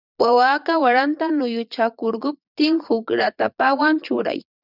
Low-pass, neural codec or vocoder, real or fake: 5.4 kHz; vocoder, 22.05 kHz, 80 mel bands, Vocos; fake